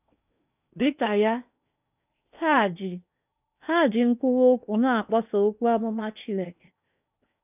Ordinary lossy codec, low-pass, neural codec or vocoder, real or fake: none; 3.6 kHz; codec, 16 kHz in and 24 kHz out, 0.8 kbps, FocalCodec, streaming, 65536 codes; fake